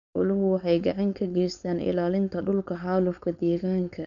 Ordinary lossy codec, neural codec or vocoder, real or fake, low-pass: none; codec, 16 kHz, 4.8 kbps, FACodec; fake; 7.2 kHz